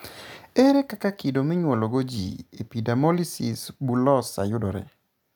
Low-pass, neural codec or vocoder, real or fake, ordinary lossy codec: none; none; real; none